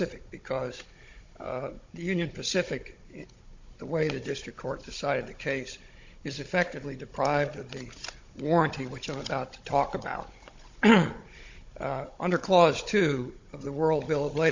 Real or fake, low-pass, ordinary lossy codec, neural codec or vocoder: fake; 7.2 kHz; MP3, 48 kbps; codec, 16 kHz, 16 kbps, FunCodec, trained on Chinese and English, 50 frames a second